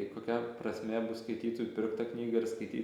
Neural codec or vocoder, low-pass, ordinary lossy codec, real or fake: none; 19.8 kHz; Opus, 64 kbps; real